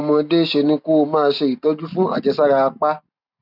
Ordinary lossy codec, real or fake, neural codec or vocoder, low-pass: none; real; none; 5.4 kHz